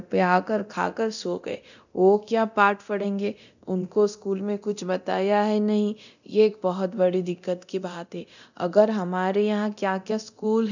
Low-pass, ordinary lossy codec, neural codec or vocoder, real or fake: 7.2 kHz; none; codec, 24 kHz, 0.9 kbps, DualCodec; fake